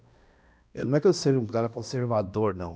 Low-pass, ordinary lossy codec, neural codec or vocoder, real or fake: none; none; codec, 16 kHz, 1 kbps, X-Codec, HuBERT features, trained on balanced general audio; fake